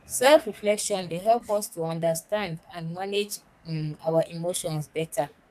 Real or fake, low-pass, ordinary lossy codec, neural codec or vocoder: fake; 14.4 kHz; none; codec, 44.1 kHz, 2.6 kbps, SNAC